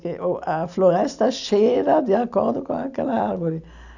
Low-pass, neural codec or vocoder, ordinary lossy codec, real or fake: 7.2 kHz; none; none; real